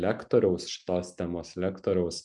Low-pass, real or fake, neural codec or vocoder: 10.8 kHz; real; none